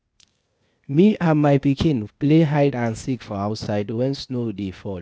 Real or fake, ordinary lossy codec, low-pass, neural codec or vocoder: fake; none; none; codec, 16 kHz, 0.8 kbps, ZipCodec